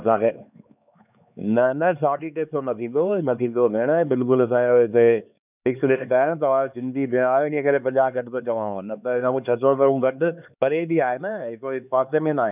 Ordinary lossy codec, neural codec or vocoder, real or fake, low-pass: none; codec, 16 kHz, 4 kbps, X-Codec, HuBERT features, trained on LibriSpeech; fake; 3.6 kHz